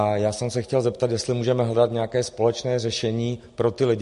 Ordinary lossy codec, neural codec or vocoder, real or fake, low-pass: MP3, 48 kbps; none; real; 14.4 kHz